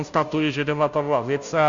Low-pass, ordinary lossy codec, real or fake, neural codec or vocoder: 7.2 kHz; Opus, 64 kbps; fake; codec, 16 kHz, 0.5 kbps, FunCodec, trained on Chinese and English, 25 frames a second